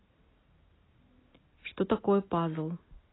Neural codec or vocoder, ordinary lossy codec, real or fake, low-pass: none; AAC, 16 kbps; real; 7.2 kHz